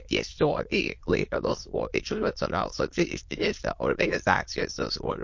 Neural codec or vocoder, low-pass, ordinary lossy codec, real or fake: autoencoder, 22.05 kHz, a latent of 192 numbers a frame, VITS, trained on many speakers; 7.2 kHz; MP3, 48 kbps; fake